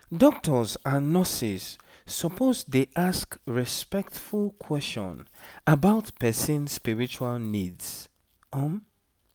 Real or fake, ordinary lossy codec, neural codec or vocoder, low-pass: real; none; none; none